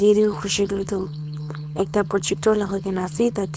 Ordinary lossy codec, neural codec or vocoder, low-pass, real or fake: none; codec, 16 kHz, 4.8 kbps, FACodec; none; fake